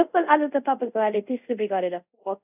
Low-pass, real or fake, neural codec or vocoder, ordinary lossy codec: 3.6 kHz; fake; codec, 24 kHz, 0.5 kbps, DualCodec; none